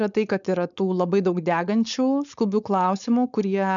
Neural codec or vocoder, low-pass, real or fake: codec, 16 kHz, 4.8 kbps, FACodec; 7.2 kHz; fake